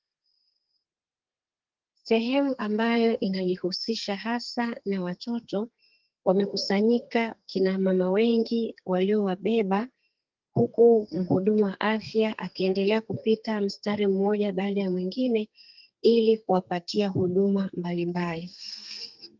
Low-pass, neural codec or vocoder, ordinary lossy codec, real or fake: 7.2 kHz; codec, 32 kHz, 1.9 kbps, SNAC; Opus, 32 kbps; fake